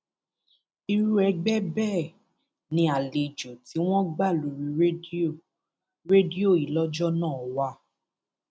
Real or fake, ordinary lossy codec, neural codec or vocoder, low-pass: real; none; none; none